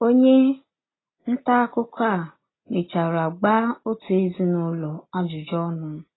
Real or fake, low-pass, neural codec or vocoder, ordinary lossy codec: real; 7.2 kHz; none; AAC, 16 kbps